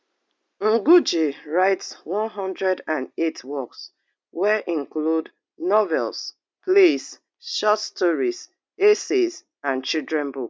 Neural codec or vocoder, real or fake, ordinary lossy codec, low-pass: none; real; none; none